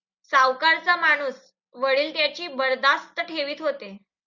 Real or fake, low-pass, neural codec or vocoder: real; 7.2 kHz; none